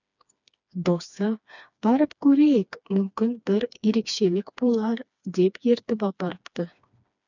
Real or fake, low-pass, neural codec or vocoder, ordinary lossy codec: fake; 7.2 kHz; codec, 16 kHz, 2 kbps, FreqCodec, smaller model; none